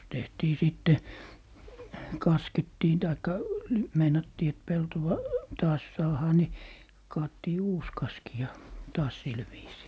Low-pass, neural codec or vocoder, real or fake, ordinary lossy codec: none; none; real; none